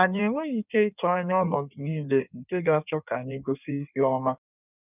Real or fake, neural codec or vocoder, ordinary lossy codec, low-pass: fake; codec, 16 kHz in and 24 kHz out, 1.1 kbps, FireRedTTS-2 codec; none; 3.6 kHz